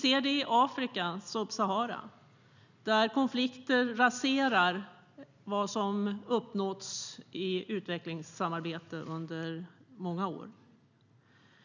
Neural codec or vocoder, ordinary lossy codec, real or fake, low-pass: none; none; real; 7.2 kHz